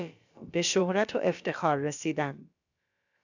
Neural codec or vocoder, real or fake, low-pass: codec, 16 kHz, about 1 kbps, DyCAST, with the encoder's durations; fake; 7.2 kHz